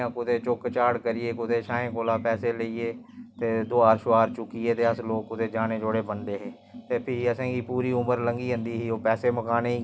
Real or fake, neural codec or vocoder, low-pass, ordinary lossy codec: real; none; none; none